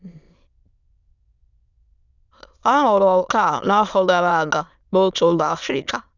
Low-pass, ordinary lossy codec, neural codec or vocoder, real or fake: 7.2 kHz; none; autoencoder, 22.05 kHz, a latent of 192 numbers a frame, VITS, trained on many speakers; fake